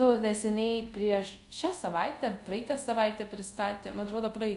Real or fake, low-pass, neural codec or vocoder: fake; 10.8 kHz; codec, 24 kHz, 0.5 kbps, DualCodec